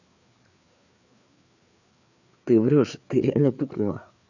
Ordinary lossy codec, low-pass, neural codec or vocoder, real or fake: none; 7.2 kHz; codec, 16 kHz, 4 kbps, FreqCodec, larger model; fake